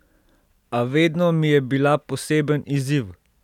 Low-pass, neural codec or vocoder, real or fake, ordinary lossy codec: 19.8 kHz; none; real; none